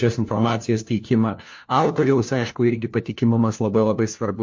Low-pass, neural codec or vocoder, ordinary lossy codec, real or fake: 7.2 kHz; codec, 16 kHz, 1 kbps, FunCodec, trained on LibriTTS, 50 frames a second; MP3, 48 kbps; fake